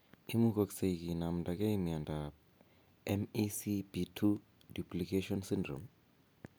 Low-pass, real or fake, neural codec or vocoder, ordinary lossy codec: none; real; none; none